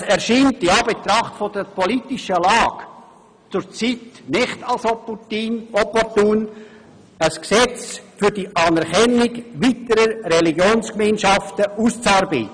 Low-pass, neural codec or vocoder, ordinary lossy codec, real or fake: 9.9 kHz; none; none; real